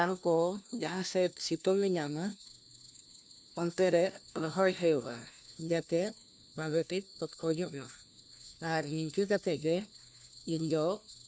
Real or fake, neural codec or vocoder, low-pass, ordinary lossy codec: fake; codec, 16 kHz, 1 kbps, FunCodec, trained on LibriTTS, 50 frames a second; none; none